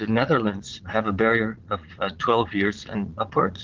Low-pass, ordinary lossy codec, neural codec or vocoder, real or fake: 7.2 kHz; Opus, 16 kbps; vocoder, 22.05 kHz, 80 mel bands, Vocos; fake